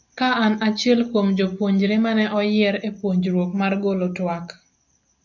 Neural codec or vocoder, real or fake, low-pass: none; real; 7.2 kHz